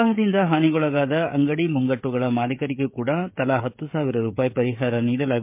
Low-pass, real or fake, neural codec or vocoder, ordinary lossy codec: 3.6 kHz; fake; codec, 16 kHz, 16 kbps, FreqCodec, smaller model; MP3, 32 kbps